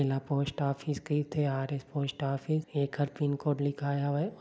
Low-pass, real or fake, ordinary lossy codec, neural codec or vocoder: none; real; none; none